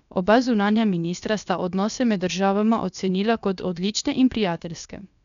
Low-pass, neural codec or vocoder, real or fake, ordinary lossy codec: 7.2 kHz; codec, 16 kHz, about 1 kbps, DyCAST, with the encoder's durations; fake; none